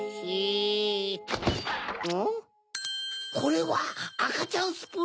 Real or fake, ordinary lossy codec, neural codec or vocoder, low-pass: real; none; none; none